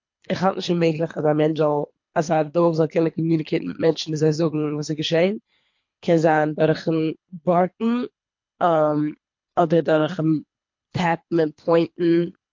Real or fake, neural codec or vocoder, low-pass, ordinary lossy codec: fake; codec, 24 kHz, 3 kbps, HILCodec; 7.2 kHz; MP3, 48 kbps